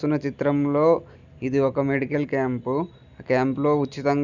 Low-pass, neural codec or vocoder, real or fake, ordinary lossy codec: 7.2 kHz; none; real; none